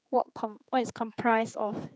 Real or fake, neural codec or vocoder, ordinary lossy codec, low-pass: fake; codec, 16 kHz, 4 kbps, X-Codec, HuBERT features, trained on general audio; none; none